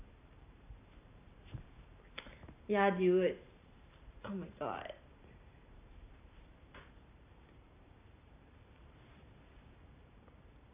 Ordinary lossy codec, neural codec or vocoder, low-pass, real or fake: none; vocoder, 44.1 kHz, 128 mel bands every 512 samples, BigVGAN v2; 3.6 kHz; fake